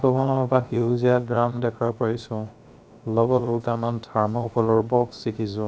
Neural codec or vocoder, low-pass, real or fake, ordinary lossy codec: codec, 16 kHz, about 1 kbps, DyCAST, with the encoder's durations; none; fake; none